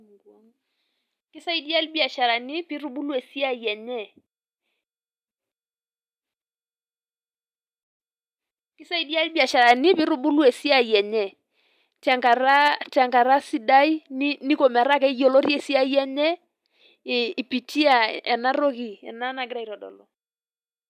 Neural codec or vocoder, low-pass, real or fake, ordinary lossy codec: none; 14.4 kHz; real; none